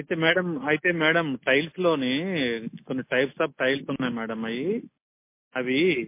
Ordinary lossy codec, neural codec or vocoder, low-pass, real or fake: MP3, 24 kbps; none; 3.6 kHz; real